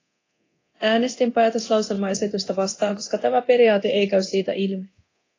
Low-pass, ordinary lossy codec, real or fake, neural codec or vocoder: 7.2 kHz; AAC, 32 kbps; fake; codec, 24 kHz, 0.9 kbps, DualCodec